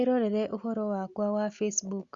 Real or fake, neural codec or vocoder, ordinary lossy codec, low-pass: real; none; Opus, 64 kbps; 7.2 kHz